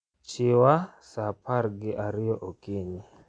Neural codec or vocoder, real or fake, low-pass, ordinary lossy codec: none; real; 9.9 kHz; none